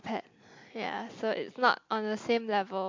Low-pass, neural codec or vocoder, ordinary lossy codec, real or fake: 7.2 kHz; none; MP3, 48 kbps; real